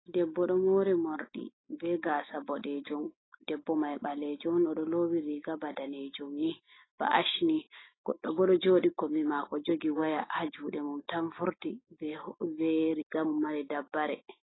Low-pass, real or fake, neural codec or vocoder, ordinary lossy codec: 7.2 kHz; real; none; AAC, 16 kbps